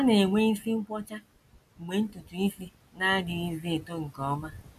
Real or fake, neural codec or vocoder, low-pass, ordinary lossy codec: real; none; 14.4 kHz; none